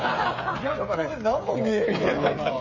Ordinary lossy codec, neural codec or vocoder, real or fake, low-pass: MP3, 32 kbps; codec, 16 kHz, 16 kbps, FreqCodec, smaller model; fake; 7.2 kHz